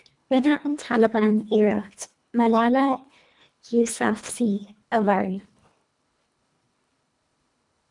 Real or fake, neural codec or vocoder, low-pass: fake; codec, 24 kHz, 1.5 kbps, HILCodec; 10.8 kHz